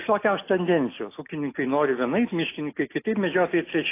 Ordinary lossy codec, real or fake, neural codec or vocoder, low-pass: AAC, 24 kbps; real; none; 3.6 kHz